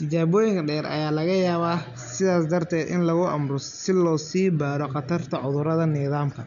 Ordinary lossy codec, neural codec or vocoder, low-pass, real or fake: none; none; 7.2 kHz; real